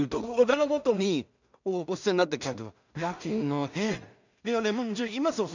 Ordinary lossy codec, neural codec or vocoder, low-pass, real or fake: none; codec, 16 kHz in and 24 kHz out, 0.4 kbps, LongCat-Audio-Codec, two codebook decoder; 7.2 kHz; fake